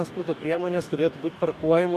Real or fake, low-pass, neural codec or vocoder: fake; 14.4 kHz; codec, 44.1 kHz, 2.6 kbps, DAC